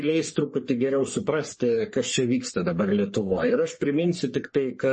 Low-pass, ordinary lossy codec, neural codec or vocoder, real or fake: 10.8 kHz; MP3, 32 kbps; codec, 44.1 kHz, 3.4 kbps, Pupu-Codec; fake